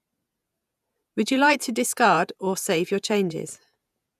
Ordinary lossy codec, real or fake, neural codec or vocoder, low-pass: none; fake; vocoder, 48 kHz, 128 mel bands, Vocos; 14.4 kHz